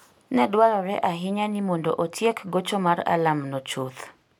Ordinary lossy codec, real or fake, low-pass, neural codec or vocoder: none; real; 19.8 kHz; none